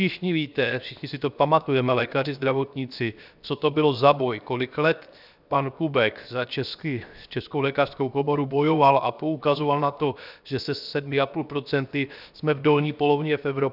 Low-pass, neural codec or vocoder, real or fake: 5.4 kHz; codec, 16 kHz, 0.7 kbps, FocalCodec; fake